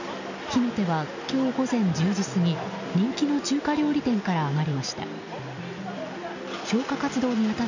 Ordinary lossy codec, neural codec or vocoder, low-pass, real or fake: none; none; 7.2 kHz; real